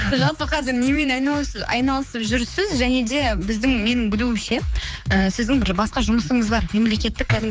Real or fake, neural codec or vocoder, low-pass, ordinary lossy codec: fake; codec, 16 kHz, 4 kbps, X-Codec, HuBERT features, trained on general audio; none; none